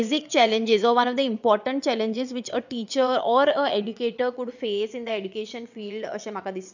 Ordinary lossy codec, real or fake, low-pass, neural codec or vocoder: none; fake; 7.2 kHz; vocoder, 22.05 kHz, 80 mel bands, WaveNeXt